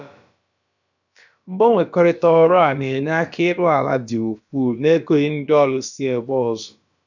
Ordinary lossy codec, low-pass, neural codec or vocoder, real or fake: none; 7.2 kHz; codec, 16 kHz, about 1 kbps, DyCAST, with the encoder's durations; fake